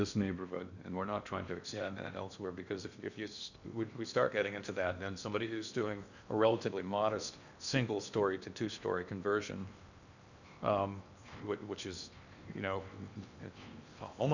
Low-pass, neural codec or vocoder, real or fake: 7.2 kHz; codec, 16 kHz in and 24 kHz out, 0.8 kbps, FocalCodec, streaming, 65536 codes; fake